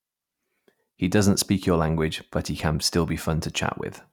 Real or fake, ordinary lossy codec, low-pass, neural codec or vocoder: real; none; 19.8 kHz; none